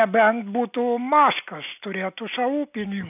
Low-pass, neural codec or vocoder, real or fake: 3.6 kHz; none; real